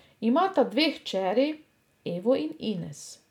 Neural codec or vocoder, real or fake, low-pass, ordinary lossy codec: none; real; 19.8 kHz; none